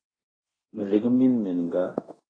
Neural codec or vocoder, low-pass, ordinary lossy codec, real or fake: codec, 24 kHz, 0.9 kbps, DualCodec; 9.9 kHz; AAC, 32 kbps; fake